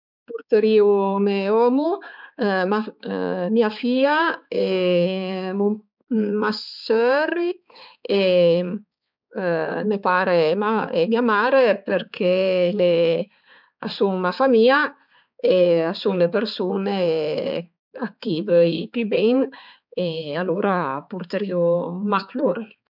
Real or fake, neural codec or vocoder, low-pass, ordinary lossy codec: fake; codec, 16 kHz, 4 kbps, X-Codec, HuBERT features, trained on balanced general audio; 5.4 kHz; none